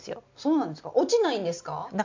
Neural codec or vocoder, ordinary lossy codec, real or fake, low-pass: none; MP3, 64 kbps; real; 7.2 kHz